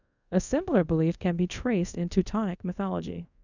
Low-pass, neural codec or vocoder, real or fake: 7.2 kHz; codec, 24 kHz, 0.5 kbps, DualCodec; fake